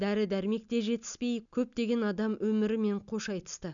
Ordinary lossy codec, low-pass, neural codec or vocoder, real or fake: none; 7.2 kHz; none; real